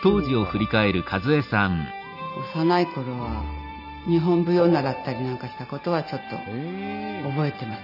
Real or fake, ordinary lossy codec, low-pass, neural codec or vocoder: real; none; 5.4 kHz; none